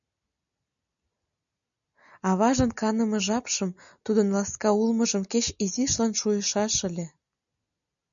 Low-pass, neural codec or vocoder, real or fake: 7.2 kHz; none; real